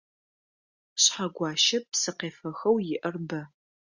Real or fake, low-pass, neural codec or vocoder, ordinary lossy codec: real; 7.2 kHz; none; Opus, 64 kbps